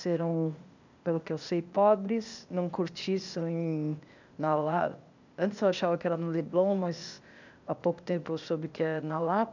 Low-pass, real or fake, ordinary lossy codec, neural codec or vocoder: 7.2 kHz; fake; none; codec, 16 kHz, 0.8 kbps, ZipCodec